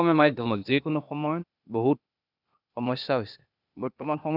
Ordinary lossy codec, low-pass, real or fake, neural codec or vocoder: none; 5.4 kHz; fake; codec, 16 kHz, 0.8 kbps, ZipCodec